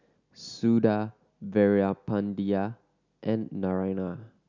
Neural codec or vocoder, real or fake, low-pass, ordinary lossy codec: none; real; 7.2 kHz; none